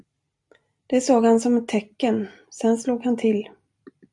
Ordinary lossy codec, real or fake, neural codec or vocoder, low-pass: AAC, 64 kbps; real; none; 10.8 kHz